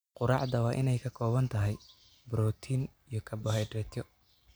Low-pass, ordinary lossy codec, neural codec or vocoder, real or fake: none; none; none; real